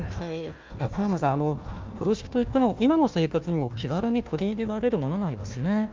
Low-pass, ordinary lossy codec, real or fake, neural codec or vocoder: 7.2 kHz; Opus, 24 kbps; fake; codec, 16 kHz, 1 kbps, FunCodec, trained on Chinese and English, 50 frames a second